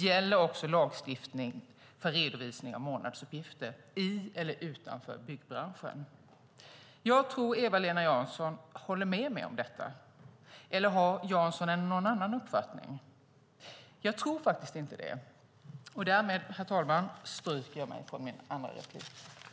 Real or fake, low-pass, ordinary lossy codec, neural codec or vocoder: real; none; none; none